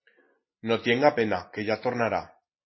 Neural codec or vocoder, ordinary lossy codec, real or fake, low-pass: none; MP3, 24 kbps; real; 7.2 kHz